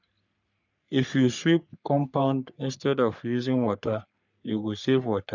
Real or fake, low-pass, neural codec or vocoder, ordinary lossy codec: fake; 7.2 kHz; codec, 44.1 kHz, 3.4 kbps, Pupu-Codec; none